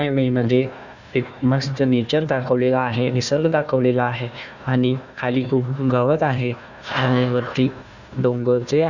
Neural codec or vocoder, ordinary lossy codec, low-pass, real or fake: codec, 16 kHz, 1 kbps, FunCodec, trained on Chinese and English, 50 frames a second; none; 7.2 kHz; fake